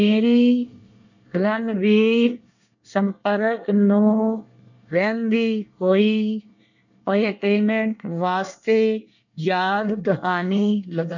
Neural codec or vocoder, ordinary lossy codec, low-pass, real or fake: codec, 24 kHz, 1 kbps, SNAC; none; 7.2 kHz; fake